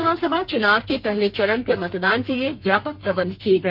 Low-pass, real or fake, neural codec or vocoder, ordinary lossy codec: 5.4 kHz; fake; codec, 32 kHz, 1.9 kbps, SNAC; none